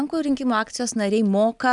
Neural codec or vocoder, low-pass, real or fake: none; 10.8 kHz; real